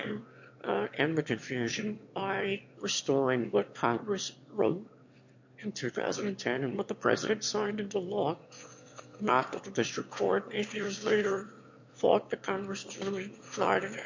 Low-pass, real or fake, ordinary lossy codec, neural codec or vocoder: 7.2 kHz; fake; MP3, 48 kbps; autoencoder, 22.05 kHz, a latent of 192 numbers a frame, VITS, trained on one speaker